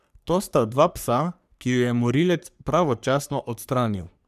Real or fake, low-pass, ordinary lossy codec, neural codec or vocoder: fake; 14.4 kHz; none; codec, 44.1 kHz, 3.4 kbps, Pupu-Codec